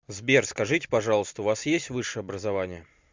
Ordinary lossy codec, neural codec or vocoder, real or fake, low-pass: MP3, 64 kbps; none; real; 7.2 kHz